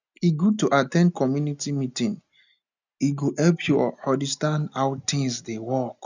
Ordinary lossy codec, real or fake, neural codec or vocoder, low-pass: none; real; none; 7.2 kHz